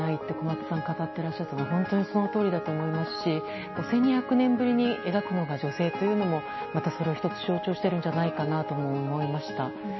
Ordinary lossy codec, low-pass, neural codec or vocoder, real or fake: MP3, 24 kbps; 7.2 kHz; none; real